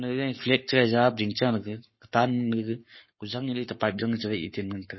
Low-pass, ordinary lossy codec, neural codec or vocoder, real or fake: 7.2 kHz; MP3, 24 kbps; codec, 44.1 kHz, 7.8 kbps, Pupu-Codec; fake